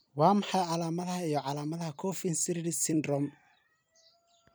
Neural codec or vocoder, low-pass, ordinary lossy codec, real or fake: vocoder, 44.1 kHz, 128 mel bands every 256 samples, BigVGAN v2; none; none; fake